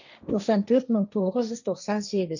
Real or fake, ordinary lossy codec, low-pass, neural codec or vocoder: fake; none; 7.2 kHz; codec, 16 kHz, 1.1 kbps, Voila-Tokenizer